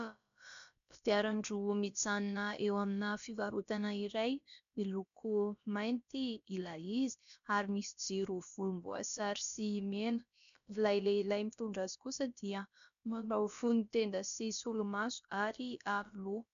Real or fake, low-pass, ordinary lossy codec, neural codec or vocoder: fake; 7.2 kHz; MP3, 64 kbps; codec, 16 kHz, about 1 kbps, DyCAST, with the encoder's durations